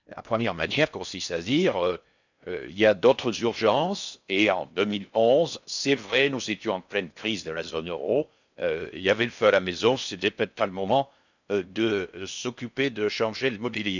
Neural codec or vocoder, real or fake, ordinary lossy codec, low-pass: codec, 16 kHz in and 24 kHz out, 0.6 kbps, FocalCodec, streaming, 4096 codes; fake; none; 7.2 kHz